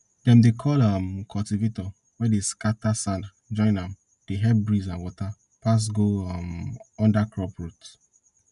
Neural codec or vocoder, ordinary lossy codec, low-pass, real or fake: none; MP3, 96 kbps; 10.8 kHz; real